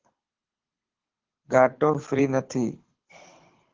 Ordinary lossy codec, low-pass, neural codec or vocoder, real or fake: Opus, 16 kbps; 7.2 kHz; codec, 24 kHz, 6 kbps, HILCodec; fake